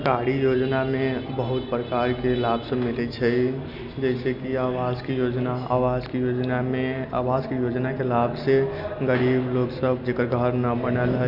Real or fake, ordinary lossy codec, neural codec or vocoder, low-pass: real; none; none; 5.4 kHz